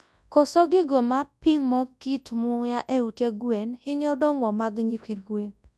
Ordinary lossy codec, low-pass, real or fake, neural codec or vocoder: none; none; fake; codec, 24 kHz, 0.9 kbps, WavTokenizer, large speech release